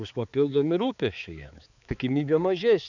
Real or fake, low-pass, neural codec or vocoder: fake; 7.2 kHz; codec, 16 kHz, 4 kbps, X-Codec, HuBERT features, trained on balanced general audio